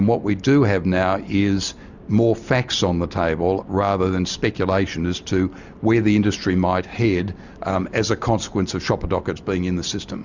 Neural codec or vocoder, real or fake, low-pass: none; real; 7.2 kHz